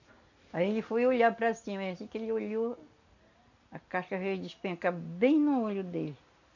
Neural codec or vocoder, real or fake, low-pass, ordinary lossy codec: none; real; 7.2 kHz; none